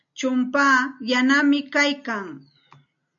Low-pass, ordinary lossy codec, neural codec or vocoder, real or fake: 7.2 kHz; MP3, 96 kbps; none; real